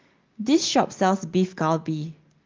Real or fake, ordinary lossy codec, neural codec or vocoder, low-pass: real; Opus, 32 kbps; none; 7.2 kHz